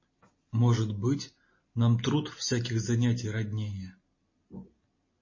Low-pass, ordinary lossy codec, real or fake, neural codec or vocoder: 7.2 kHz; MP3, 32 kbps; real; none